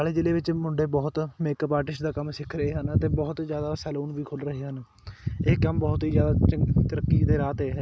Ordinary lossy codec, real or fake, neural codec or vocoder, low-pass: none; real; none; none